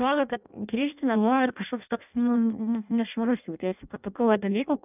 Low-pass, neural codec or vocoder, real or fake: 3.6 kHz; codec, 16 kHz in and 24 kHz out, 0.6 kbps, FireRedTTS-2 codec; fake